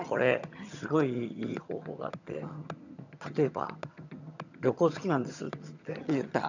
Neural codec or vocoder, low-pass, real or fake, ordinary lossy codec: vocoder, 22.05 kHz, 80 mel bands, HiFi-GAN; 7.2 kHz; fake; none